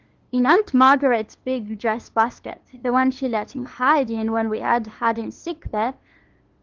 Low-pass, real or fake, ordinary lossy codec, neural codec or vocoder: 7.2 kHz; fake; Opus, 16 kbps; codec, 16 kHz, 2 kbps, FunCodec, trained on LibriTTS, 25 frames a second